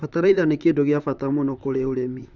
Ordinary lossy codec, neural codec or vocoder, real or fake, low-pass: none; vocoder, 44.1 kHz, 128 mel bands, Pupu-Vocoder; fake; 7.2 kHz